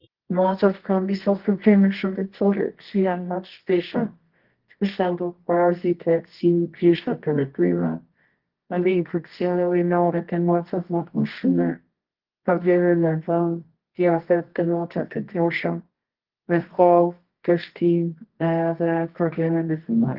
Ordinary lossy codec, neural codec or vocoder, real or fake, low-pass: Opus, 16 kbps; codec, 24 kHz, 0.9 kbps, WavTokenizer, medium music audio release; fake; 5.4 kHz